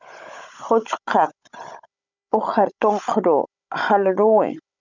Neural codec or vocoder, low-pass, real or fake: codec, 16 kHz, 16 kbps, FunCodec, trained on Chinese and English, 50 frames a second; 7.2 kHz; fake